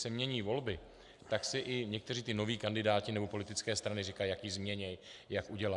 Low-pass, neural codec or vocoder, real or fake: 10.8 kHz; none; real